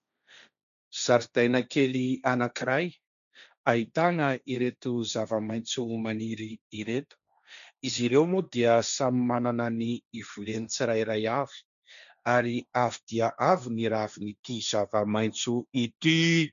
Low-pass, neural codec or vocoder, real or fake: 7.2 kHz; codec, 16 kHz, 1.1 kbps, Voila-Tokenizer; fake